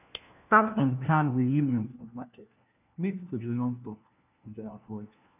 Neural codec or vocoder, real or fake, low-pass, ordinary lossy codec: codec, 16 kHz, 1 kbps, FunCodec, trained on LibriTTS, 50 frames a second; fake; 3.6 kHz; none